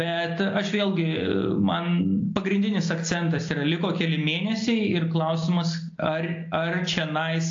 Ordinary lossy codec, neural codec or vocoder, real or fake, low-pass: AAC, 48 kbps; none; real; 7.2 kHz